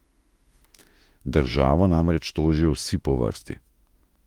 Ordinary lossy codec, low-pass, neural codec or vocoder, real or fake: Opus, 24 kbps; 19.8 kHz; autoencoder, 48 kHz, 32 numbers a frame, DAC-VAE, trained on Japanese speech; fake